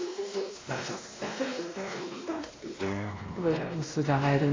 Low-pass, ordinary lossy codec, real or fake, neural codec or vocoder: 7.2 kHz; AAC, 32 kbps; fake; codec, 16 kHz, 1 kbps, X-Codec, WavLM features, trained on Multilingual LibriSpeech